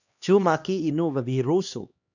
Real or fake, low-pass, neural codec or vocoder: fake; 7.2 kHz; codec, 16 kHz, 2 kbps, X-Codec, HuBERT features, trained on LibriSpeech